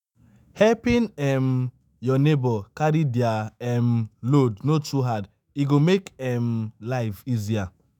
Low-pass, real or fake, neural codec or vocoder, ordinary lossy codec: 19.8 kHz; fake; autoencoder, 48 kHz, 128 numbers a frame, DAC-VAE, trained on Japanese speech; none